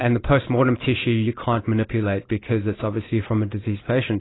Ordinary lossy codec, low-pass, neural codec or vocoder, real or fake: AAC, 16 kbps; 7.2 kHz; none; real